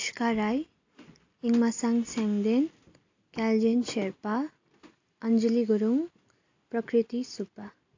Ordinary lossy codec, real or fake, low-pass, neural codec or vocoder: AAC, 48 kbps; real; 7.2 kHz; none